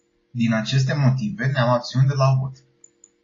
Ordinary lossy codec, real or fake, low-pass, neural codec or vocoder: AAC, 32 kbps; real; 7.2 kHz; none